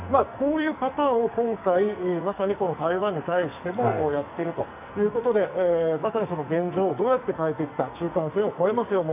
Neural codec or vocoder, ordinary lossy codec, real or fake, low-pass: codec, 44.1 kHz, 2.6 kbps, SNAC; none; fake; 3.6 kHz